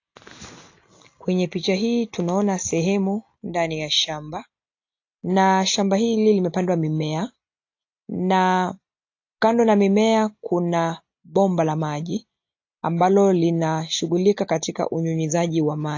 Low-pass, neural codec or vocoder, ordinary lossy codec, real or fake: 7.2 kHz; none; AAC, 48 kbps; real